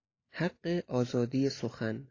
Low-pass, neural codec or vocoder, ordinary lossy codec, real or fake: 7.2 kHz; none; AAC, 32 kbps; real